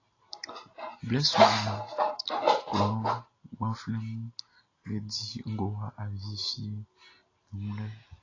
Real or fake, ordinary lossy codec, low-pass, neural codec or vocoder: real; AAC, 32 kbps; 7.2 kHz; none